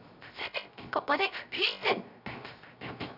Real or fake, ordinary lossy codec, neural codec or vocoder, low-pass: fake; none; codec, 16 kHz, 0.3 kbps, FocalCodec; 5.4 kHz